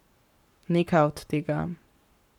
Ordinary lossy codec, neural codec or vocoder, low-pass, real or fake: none; codec, 44.1 kHz, 7.8 kbps, Pupu-Codec; 19.8 kHz; fake